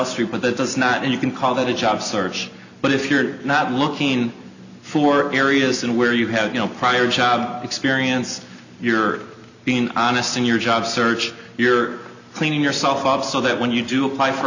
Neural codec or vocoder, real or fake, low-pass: none; real; 7.2 kHz